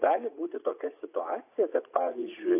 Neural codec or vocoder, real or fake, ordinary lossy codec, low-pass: vocoder, 44.1 kHz, 80 mel bands, Vocos; fake; AAC, 24 kbps; 3.6 kHz